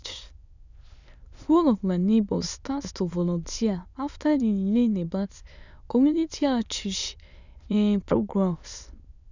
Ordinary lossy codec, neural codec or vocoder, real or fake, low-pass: none; autoencoder, 22.05 kHz, a latent of 192 numbers a frame, VITS, trained on many speakers; fake; 7.2 kHz